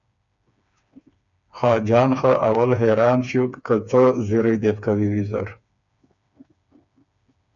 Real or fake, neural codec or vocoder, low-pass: fake; codec, 16 kHz, 4 kbps, FreqCodec, smaller model; 7.2 kHz